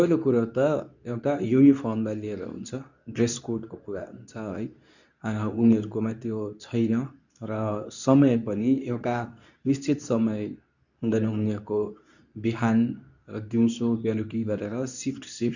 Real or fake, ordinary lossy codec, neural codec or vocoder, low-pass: fake; none; codec, 24 kHz, 0.9 kbps, WavTokenizer, medium speech release version 2; 7.2 kHz